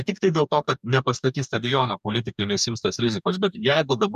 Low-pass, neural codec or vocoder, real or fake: 14.4 kHz; codec, 44.1 kHz, 2.6 kbps, DAC; fake